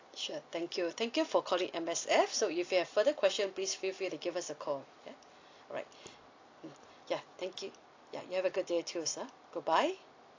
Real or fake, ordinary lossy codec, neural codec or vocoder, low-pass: real; AAC, 48 kbps; none; 7.2 kHz